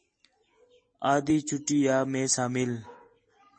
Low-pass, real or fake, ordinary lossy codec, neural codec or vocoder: 9.9 kHz; real; MP3, 32 kbps; none